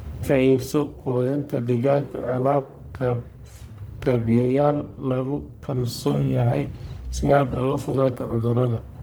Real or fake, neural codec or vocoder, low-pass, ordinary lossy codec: fake; codec, 44.1 kHz, 1.7 kbps, Pupu-Codec; none; none